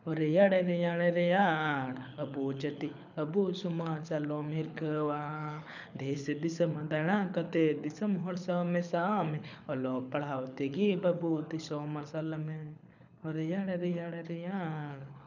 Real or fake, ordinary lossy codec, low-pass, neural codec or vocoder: fake; none; 7.2 kHz; codec, 24 kHz, 6 kbps, HILCodec